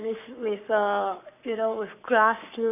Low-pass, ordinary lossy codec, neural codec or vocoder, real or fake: 3.6 kHz; none; codec, 44.1 kHz, 7.8 kbps, Pupu-Codec; fake